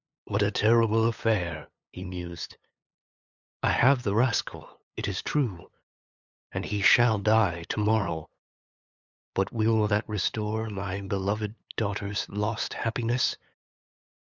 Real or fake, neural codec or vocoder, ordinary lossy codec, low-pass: fake; codec, 16 kHz, 8 kbps, FunCodec, trained on LibriTTS, 25 frames a second; Opus, 64 kbps; 7.2 kHz